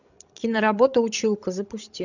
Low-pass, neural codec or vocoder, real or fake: 7.2 kHz; codec, 16 kHz, 16 kbps, FreqCodec, larger model; fake